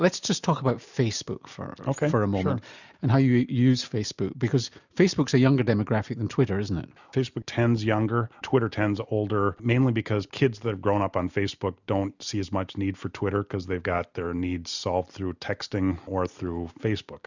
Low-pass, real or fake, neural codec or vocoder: 7.2 kHz; real; none